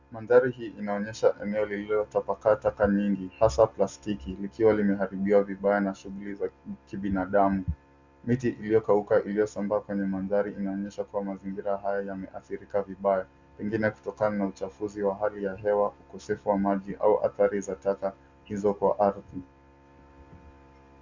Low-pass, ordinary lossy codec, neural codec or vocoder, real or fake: 7.2 kHz; Opus, 64 kbps; none; real